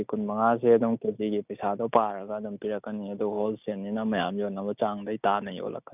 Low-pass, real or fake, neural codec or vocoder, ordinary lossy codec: 3.6 kHz; real; none; none